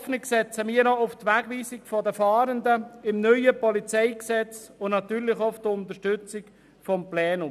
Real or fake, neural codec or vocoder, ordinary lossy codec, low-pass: real; none; none; 14.4 kHz